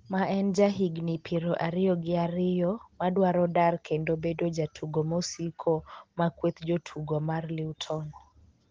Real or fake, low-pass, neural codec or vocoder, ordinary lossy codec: real; 7.2 kHz; none; Opus, 16 kbps